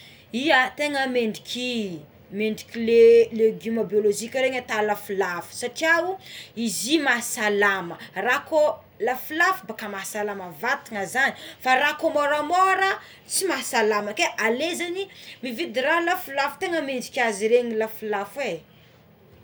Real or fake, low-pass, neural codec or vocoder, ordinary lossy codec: real; none; none; none